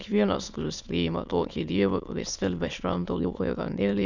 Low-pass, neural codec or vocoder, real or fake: 7.2 kHz; autoencoder, 22.05 kHz, a latent of 192 numbers a frame, VITS, trained on many speakers; fake